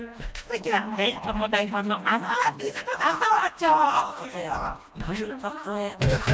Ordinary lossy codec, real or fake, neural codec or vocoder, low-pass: none; fake; codec, 16 kHz, 1 kbps, FreqCodec, smaller model; none